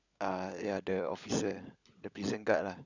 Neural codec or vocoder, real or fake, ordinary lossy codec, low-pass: codec, 16 kHz, 16 kbps, FunCodec, trained on LibriTTS, 50 frames a second; fake; none; 7.2 kHz